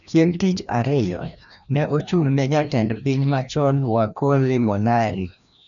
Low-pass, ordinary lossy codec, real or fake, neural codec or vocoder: 7.2 kHz; none; fake; codec, 16 kHz, 1 kbps, FreqCodec, larger model